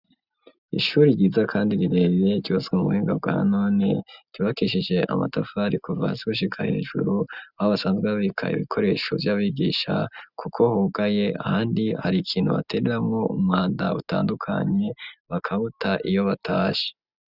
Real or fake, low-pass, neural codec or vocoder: real; 5.4 kHz; none